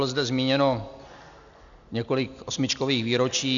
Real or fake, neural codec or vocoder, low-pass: real; none; 7.2 kHz